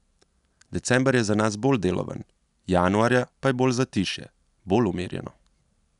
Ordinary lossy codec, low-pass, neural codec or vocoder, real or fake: none; 10.8 kHz; none; real